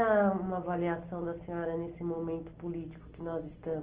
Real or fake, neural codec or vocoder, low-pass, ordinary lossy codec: real; none; 3.6 kHz; Opus, 16 kbps